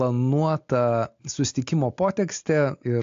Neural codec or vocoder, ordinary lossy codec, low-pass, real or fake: none; AAC, 48 kbps; 7.2 kHz; real